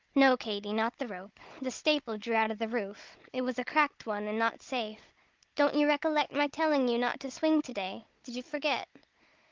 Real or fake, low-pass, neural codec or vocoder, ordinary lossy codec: fake; 7.2 kHz; codec, 16 kHz, 16 kbps, FunCodec, trained on Chinese and English, 50 frames a second; Opus, 32 kbps